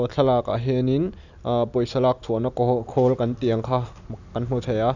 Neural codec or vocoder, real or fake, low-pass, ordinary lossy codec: none; real; 7.2 kHz; none